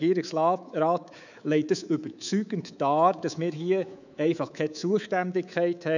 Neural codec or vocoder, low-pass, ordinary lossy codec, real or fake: codec, 24 kHz, 3.1 kbps, DualCodec; 7.2 kHz; none; fake